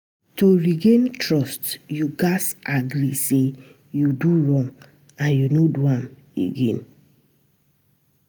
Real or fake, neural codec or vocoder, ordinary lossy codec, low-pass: real; none; none; none